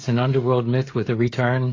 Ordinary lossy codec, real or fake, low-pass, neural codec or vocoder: AAC, 32 kbps; fake; 7.2 kHz; codec, 16 kHz, 16 kbps, FreqCodec, smaller model